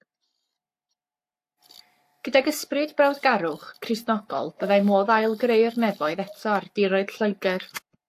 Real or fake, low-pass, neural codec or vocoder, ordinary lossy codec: fake; 14.4 kHz; codec, 44.1 kHz, 7.8 kbps, Pupu-Codec; AAC, 64 kbps